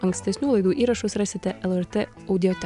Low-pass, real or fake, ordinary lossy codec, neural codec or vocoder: 10.8 kHz; real; AAC, 96 kbps; none